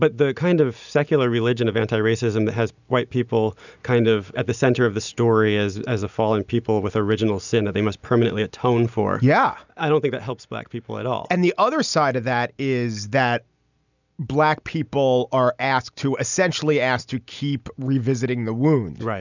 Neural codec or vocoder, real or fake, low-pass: none; real; 7.2 kHz